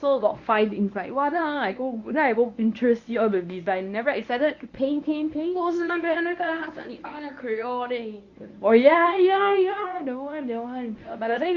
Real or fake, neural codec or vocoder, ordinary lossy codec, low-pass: fake; codec, 24 kHz, 0.9 kbps, WavTokenizer, medium speech release version 1; Opus, 64 kbps; 7.2 kHz